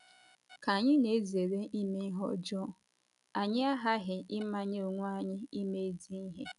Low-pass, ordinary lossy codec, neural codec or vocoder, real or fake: 10.8 kHz; none; none; real